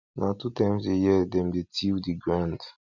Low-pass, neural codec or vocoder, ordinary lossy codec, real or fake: 7.2 kHz; none; none; real